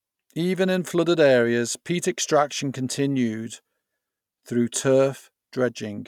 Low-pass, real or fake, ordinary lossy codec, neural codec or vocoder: 19.8 kHz; real; none; none